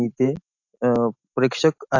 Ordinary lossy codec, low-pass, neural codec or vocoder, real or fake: AAC, 48 kbps; 7.2 kHz; none; real